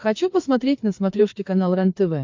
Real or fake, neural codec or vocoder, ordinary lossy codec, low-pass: fake; codec, 16 kHz, 4 kbps, FreqCodec, larger model; MP3, 48 kbps; 7.2 kHz